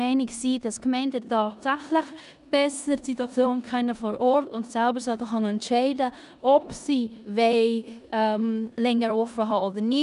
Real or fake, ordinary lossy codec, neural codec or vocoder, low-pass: fake; none; codec, 16 kHz in and 24 kHz out, 0.9 kbps, LongCat-Audio-Codec, four codebook decoder; 10.8 kHz